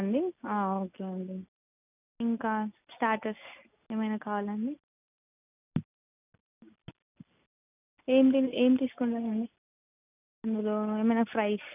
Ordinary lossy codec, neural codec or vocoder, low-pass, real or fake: none; none; 3.6 kHz; real